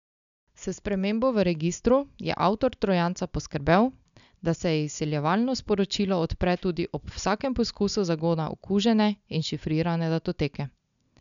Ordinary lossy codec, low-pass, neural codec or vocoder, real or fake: none; 7.2 kHz; none; real